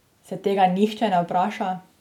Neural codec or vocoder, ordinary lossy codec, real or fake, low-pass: none; none; real; 19.8 kHz